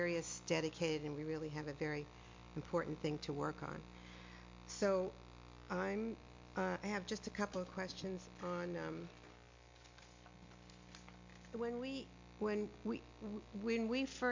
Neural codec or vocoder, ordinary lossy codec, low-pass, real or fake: none; MP3, 48 kbps; 7.2 kHz; real